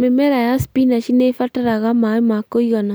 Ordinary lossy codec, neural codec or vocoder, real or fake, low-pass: none; none; real; none